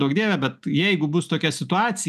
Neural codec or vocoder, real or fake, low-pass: none; real; 14.4 kHz